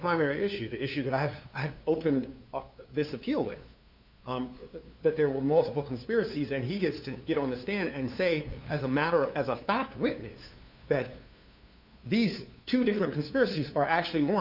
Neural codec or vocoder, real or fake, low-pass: codec, 16 kHz, 2 kbps, FunCodec, trained on LibriTTS, 25 frames a second; fake; 5.4 kHz